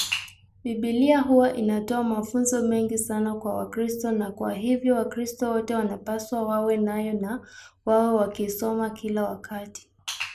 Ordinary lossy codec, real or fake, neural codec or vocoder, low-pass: none; real; none; 14.4 kHz